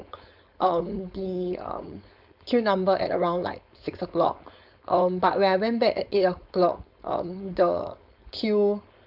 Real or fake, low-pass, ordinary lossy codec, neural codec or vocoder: fake; 5.4 kHz; AAC, 48 kbps; codec, 16 kHz, 4.8 kbps, FACodec